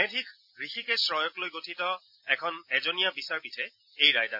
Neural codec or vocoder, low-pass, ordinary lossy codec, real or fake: none; 5.4 kHz; none; real